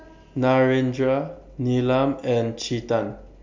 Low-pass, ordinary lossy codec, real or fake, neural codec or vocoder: 7.2 kHz; MP3, 48 kbps; real; none